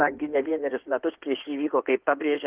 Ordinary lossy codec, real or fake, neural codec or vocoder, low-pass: Opus, 32 kbps; fake; codec, 16 kHz in and 24 kHz out, 2.2 kbps, FireRedTTS-2 codec; 3.6 kHz